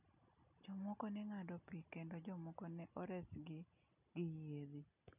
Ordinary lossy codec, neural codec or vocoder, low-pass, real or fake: none; none; 3.6 kHz; real